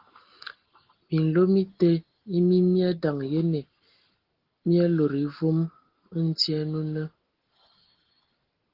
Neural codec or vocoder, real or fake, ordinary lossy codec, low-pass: none; real; Opus, 16 kbps; 5.4 kHz